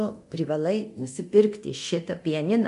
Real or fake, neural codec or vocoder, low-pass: fake; codec, 24 kHz, 0.9 kbps, DualCodec; 10.8 kHz